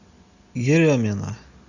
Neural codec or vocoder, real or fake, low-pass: none; real; 7.2 kHz